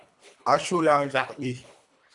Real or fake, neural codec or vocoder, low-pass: fake; codec, 24 kHz, 3 kbps, HILCodec; 10.8 kHz